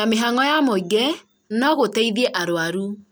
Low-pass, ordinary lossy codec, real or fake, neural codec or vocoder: none; none; real; none